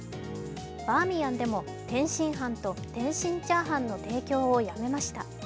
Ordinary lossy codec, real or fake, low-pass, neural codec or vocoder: none; real; none; none